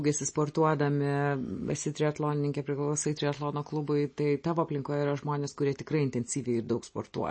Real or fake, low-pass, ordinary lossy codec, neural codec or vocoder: real; 9.9 kHz; MP3, 32 kbps; none